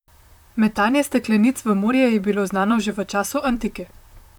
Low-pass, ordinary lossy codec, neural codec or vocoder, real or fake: 19.8 kHz; none; vocoder, 44.1 kHz, 128 mel bands, Pupu-Vocoder; fake